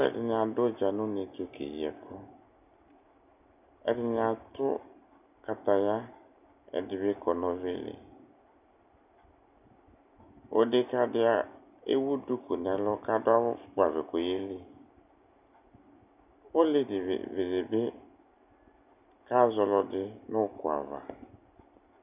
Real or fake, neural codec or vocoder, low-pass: real; none; 3.6 kHz